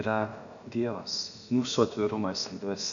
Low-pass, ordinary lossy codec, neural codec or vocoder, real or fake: 7.2 kHz; MP3, 96 kbps; codec, 16 kHz, about 1 kbps, DyCAST, with the encoder's durations; fake